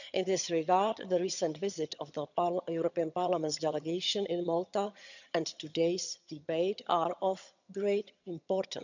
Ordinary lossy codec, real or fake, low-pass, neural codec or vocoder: none; fake; 7.2 kHz; vocoder, 22.05 kHz, 80 mel bands, HiFi-GAN